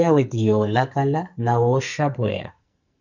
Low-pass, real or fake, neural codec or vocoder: 7.2 kHz; fake; codec, 44.1 kHz, 2.6 kbps, SNAC